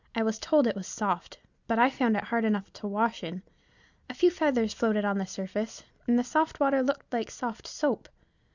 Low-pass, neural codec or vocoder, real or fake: 7.2 kHz; none; real